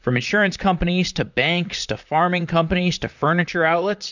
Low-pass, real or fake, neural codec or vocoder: 7.2 kHz; fake; vocoder, 44.1 kHz, 128 mel bands, Pupu-Vocoder